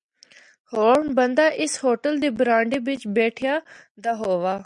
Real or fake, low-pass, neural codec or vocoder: real; 10.8 kHz; none